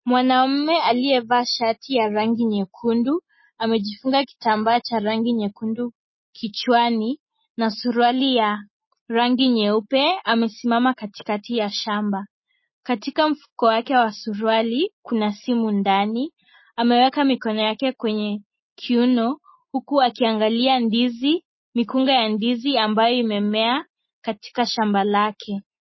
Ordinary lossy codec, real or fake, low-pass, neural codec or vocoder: MP3, 24 kbps; real; 7.2 kHz; none